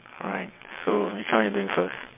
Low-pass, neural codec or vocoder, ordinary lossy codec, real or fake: 3.6 kHz; vocoder, 22.05 kHz, 80 mel bands, WaveNeXt; MP3, 24 kbps; fake